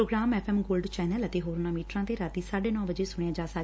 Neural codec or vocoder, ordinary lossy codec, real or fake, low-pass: none; none; real; none